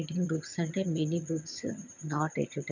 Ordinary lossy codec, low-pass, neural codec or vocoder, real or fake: none; 7.2 kHz; vocoder, 22.05 kHz, 80 mel bands, HiFi-GAN; fake